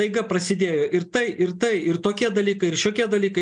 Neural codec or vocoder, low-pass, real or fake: none; 9.9 kHz; real